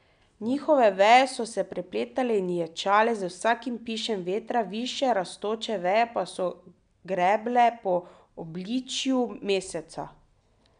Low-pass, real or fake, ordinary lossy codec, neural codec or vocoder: 9.9 kHz; real; none; none